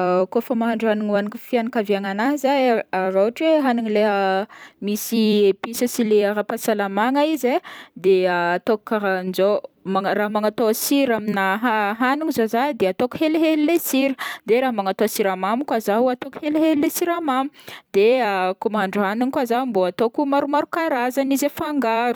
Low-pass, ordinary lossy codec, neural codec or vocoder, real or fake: none; none; vocoder, 44.1 kHz, 128 mel bands every 256 samples, BigVGAN v2; fake